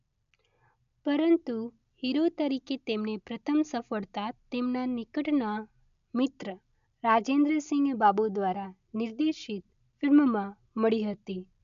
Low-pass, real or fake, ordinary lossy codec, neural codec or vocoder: 7.2 kHz; real; none; none